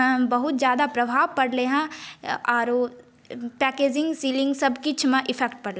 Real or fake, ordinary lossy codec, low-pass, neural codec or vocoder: real; none; none; none